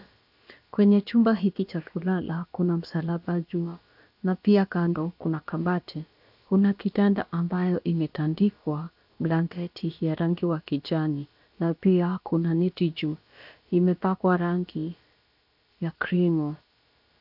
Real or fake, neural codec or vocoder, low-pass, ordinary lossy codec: fake; codec, 16 kHz, about 1 kbps, DyCAST, with the encoder's durations; 5.4 kHz; AAC, 48 kbps